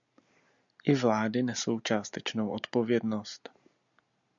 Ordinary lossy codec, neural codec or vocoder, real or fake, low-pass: MP3, 96 kbps; none; real; 7.2 kHz